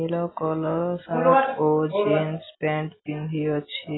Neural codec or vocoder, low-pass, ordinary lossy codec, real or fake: none; 7.2 kHz; AAC, 16 kbps; real